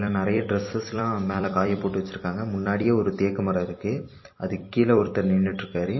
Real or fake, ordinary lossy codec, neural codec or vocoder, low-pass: fake; MP3, 24 kbps; vocoder, 44.1 kHz, 128 mel bands every 256 samples, BigVGAN v2; 7.2 kHz